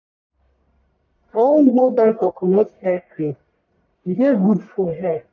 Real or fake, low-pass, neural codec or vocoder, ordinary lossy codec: fake; 7.2 kHz; codec, 44.1 kHz, 1.7 kbps, Pupu-Codec; none